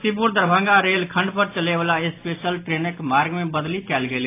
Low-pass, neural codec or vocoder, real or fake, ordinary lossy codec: 3.6 kHz; none; real; AAC, 24 kbps